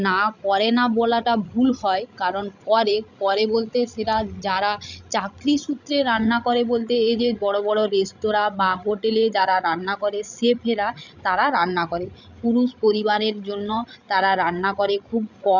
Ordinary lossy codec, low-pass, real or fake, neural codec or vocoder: none; 7.2 kHz; fake; codec, 16 kHz, 16 kbps, FreqCodec, larger model